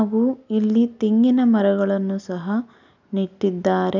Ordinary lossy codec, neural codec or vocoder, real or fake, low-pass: none; none; real; 7.2 kHz